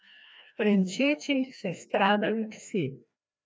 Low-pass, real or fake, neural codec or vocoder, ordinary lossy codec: none; fake; codec, 16 kHz, 1 kbps, FreqCodec, larger model; none